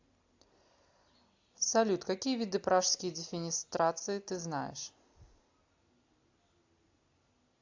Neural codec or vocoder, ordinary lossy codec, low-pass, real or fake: none; AAC, 48 kbps; 7.2 kHz; real